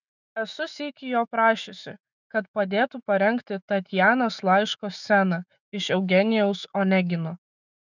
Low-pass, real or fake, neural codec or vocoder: 7.2 kHz; fake; autoencoder, 48 kHz, 128 numbers a frame, DAC-VAE, trained on Japanese speech